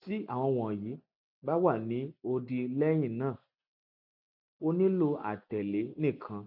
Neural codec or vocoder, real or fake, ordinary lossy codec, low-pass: none; real; none; 5.4 kHz